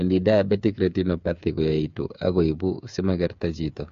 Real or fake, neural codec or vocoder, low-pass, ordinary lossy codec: fake; codec, 16 kHz, 8 kbps, FreqCodec, smaller model; 7.2 kHz; MP3, 48 kbps